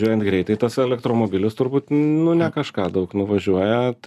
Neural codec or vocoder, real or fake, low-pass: none; real; 14.4 kHz